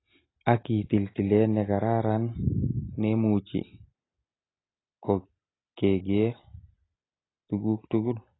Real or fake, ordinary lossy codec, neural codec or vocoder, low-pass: real; AAC, 16 kbps; none; 7.2 kHz